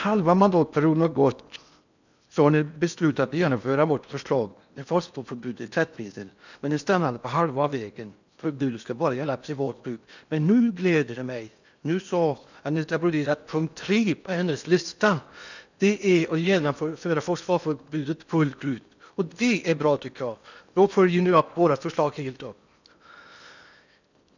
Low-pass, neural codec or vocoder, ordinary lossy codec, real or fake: 7.2 kHz; codec, 16 kHz in and 24 kHz out, 0.8 kbps, FocalCodec, streaming, 65536 codes; none; fake